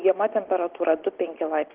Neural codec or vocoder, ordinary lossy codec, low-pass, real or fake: none; Opus, 16 kbps; 3.6 kHz; real